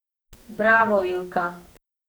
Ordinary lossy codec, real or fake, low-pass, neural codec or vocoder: none; fake; none; codec, 44.1 kHz, 2.6 kbps, SNAC